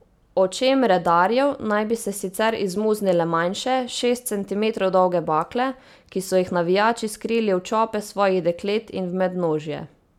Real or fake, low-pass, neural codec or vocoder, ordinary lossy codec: real; 19.8 kHz; none; none